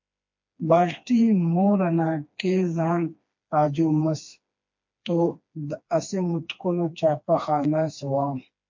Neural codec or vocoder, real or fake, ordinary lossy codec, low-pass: codec, 16 kHz, 2 kbps, FreqCodec, smaller model; fake; MP3, 48 kbps; 7.2 kHz